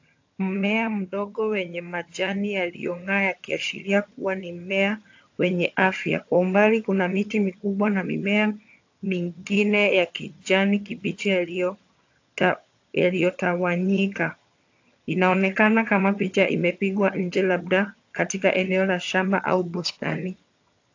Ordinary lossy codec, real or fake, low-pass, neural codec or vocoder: AAC, 48 kbps; fake; 7.2 kHz; vocoder, 22.05 kHz, 80 mel bands, HiFi-GAN